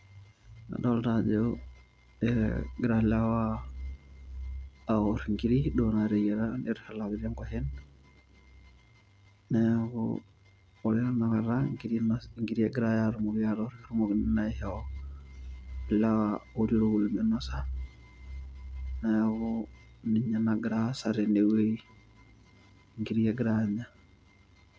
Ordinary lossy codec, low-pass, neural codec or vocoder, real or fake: none; none; none; real